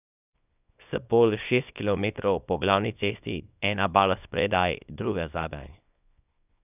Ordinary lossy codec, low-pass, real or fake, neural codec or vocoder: none; 3.6 kHz; fake; codec, 24 kHz, 0.9 kbps, WavTokenizer, small release